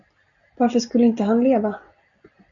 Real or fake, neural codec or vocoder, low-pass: real; none; 7.2 kHz